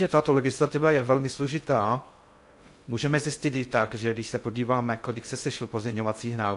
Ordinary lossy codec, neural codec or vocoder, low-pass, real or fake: AAC, 64 kbps; codec, 16 kHz in and 24 kHz out, 0.6 kbps, FocalCodec, streaming, 2048 codes; 10.8 kHz; fake